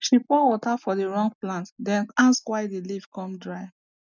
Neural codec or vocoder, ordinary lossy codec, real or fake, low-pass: none; none; real; 7.2 kHz